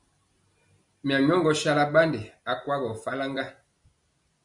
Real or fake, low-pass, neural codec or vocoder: real; 10.8 kHz; none